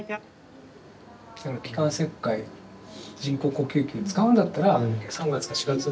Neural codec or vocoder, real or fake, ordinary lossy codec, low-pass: none; real; none; none